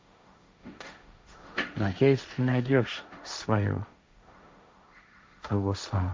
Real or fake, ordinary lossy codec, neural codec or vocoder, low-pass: fake; none; codec, 16 kHz, 1.1 kbps, Voila-Tokenizer; none